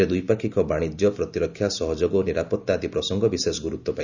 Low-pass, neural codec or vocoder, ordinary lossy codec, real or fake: 7.2 kHz; none; none; real